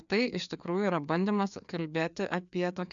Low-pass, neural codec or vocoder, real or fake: 7.2 kHz; codec, 16 kHz, 2 kbps, FreqCodec, larger model; fake